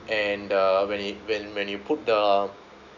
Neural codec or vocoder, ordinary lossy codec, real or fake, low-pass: none; none; real; 7.2 kHz